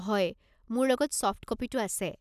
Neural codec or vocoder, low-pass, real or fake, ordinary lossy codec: none; 14.4 kHz; real; none